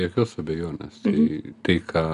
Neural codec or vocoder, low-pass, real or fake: none; 10.8 kHz; real